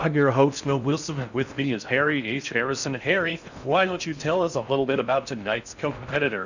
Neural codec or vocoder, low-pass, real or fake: codec, 16 kHz in and 24 kHz out, 0.6 kbps, FocalCodec, streaming, 4096 codes; 7.2 kHz; fake